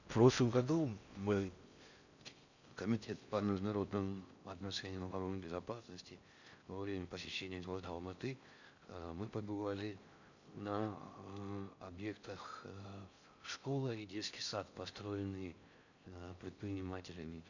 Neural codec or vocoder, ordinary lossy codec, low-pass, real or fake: codec, 16 kHz in and 24 kHz out, 0.6 kbps, FocalCodec, streaming, 4096 codes; none; 7.2 kHz; fake